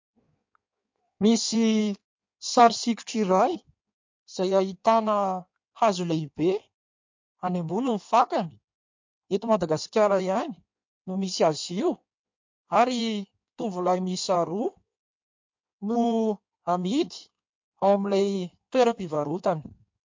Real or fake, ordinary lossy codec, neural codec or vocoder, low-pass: fake; MP3, 48 kbps; codec, 16 kHz in and 24 kHz out, 1.1 kbps, FireRedTTS-2 codec; 7.2 kHz